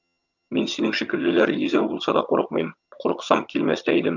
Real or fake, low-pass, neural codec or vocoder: fake; 7.2 kHz; vocoder, 22.05 kHz, 80 mel bands, HiFi-GAN